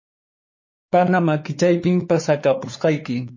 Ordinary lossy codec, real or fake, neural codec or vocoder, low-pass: MP3, 32 kbps; fake; codec, 16 kHz, 4 kbps, X-Codec, WavLM features, trained on Multilingual LibriSpeech; 7.2 kHz